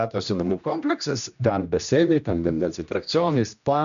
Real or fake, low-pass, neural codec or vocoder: fake; 7.2 kHz; codec, 16 kHz, 1 kbps, X-Codec, HuBERT features, trained on general audio